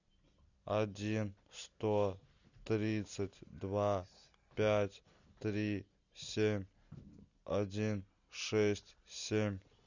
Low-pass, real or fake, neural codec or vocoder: 7.2 kHz; real; none